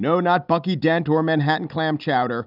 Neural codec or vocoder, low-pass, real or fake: none; 5.4 kHz; real